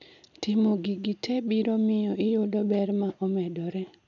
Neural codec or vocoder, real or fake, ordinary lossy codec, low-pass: none; real; none; 7.2 kHz